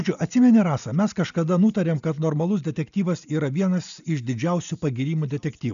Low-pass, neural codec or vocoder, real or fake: 7.2 kHz; none; real